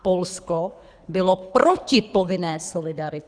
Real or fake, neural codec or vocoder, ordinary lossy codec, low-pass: fake; codec, 24 kHz, 3 kbps, HILCodec; Opus, 64 kbps; 9.9 kHz